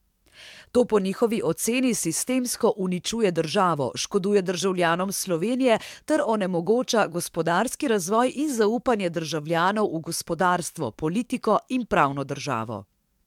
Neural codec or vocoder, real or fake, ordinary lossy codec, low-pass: codec, 44.1 kHz, 7.8 kbps, DAC; fake; MP3, 96 kbps; 19.8 kHz